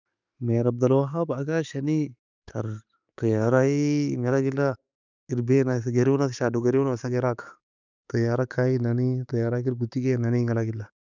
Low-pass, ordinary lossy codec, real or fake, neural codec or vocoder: 7.2 kHz; none; real; none